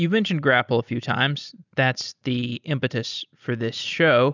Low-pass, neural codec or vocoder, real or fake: 7.2 kHz; none; real